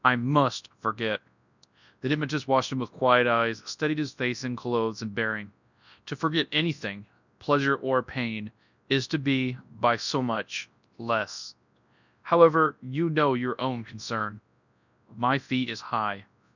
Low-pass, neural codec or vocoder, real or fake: 7.2 kHz; codec, 24 kHz, 0.9 kbps, WavTokenizer, large speech release; fake